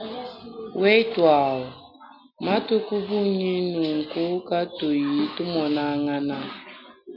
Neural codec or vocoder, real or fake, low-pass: none; real; 5.4 kHz